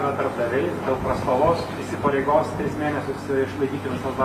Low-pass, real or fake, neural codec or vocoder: 14.4 kHz; real; none